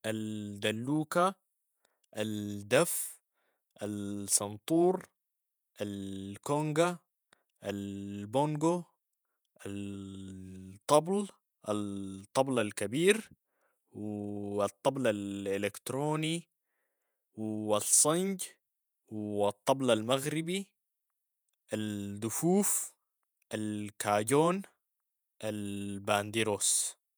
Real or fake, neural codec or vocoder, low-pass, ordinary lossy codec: fake; vocoder, 48 kHz, 128 mel bands, Vocos; none; none